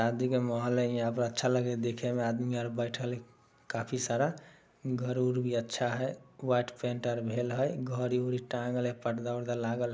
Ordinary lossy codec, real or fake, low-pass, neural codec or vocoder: none; real; none; none